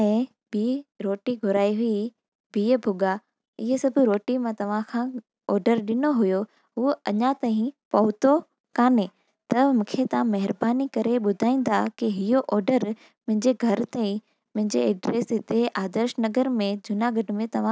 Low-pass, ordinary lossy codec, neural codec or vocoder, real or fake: none; none; none; real